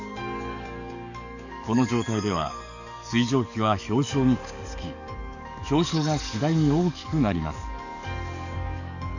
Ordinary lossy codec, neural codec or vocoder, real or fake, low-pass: none; codec, 44.1 kHz, 7.8 kbps, DAC; fake; 7.2 kHz